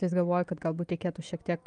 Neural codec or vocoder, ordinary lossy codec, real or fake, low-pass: none; Opus, 32 kbps; real; 9.9 kHz